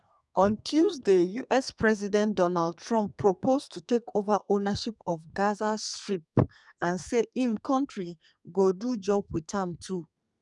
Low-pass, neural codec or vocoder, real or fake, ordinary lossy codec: 10.8 kHz; codec, 32 kHz, 1.9 kbps, SNAC; fake; none